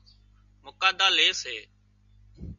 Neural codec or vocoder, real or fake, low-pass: none; real; 7.2 kHz